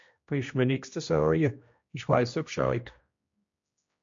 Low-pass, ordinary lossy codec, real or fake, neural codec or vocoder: 7.2 kHz; MP3, 48 kbps; fake; codec, 16 kHz, 1 kbps, X-Codec, HuBERT features, trained on general audio